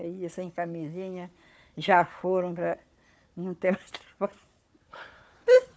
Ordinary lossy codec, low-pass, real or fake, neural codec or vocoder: none; none; fake; codec, 16 kHz, 4 kbps, FunCodec, trained on Chinese and English, 50 frames a second